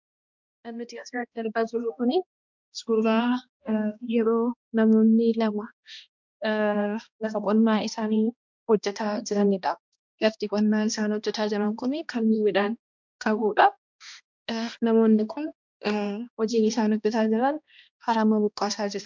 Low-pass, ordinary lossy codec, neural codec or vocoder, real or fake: 7.2 kHz; MP3, 64 kbps; codec, 16 kHz, 1 kbps, X-Codec, HuBERT features, trained on balanced general audio; fake